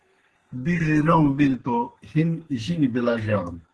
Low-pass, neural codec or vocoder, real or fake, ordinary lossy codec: 10.8 kHz; codec, 32 kHz, 1.9 kbps, SNAC; fake; Opus, 16 kbps